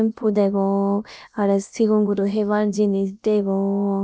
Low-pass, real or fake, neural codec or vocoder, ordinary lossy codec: none; fake; codec, 16 kHz, about 1 kbps, DyCAST, with the encoder's durations; none